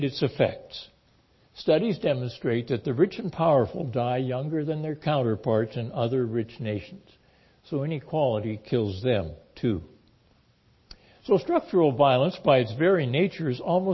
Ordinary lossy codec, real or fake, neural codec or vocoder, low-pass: MP3, 24 kbps; real; none; 7.2 kHz